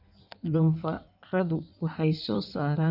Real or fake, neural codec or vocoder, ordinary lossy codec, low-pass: fake; codec, 16 kHz in and 24 kHz out, 1.1 kbps, FireRedTTS-2 codec; none; 5.4 kHz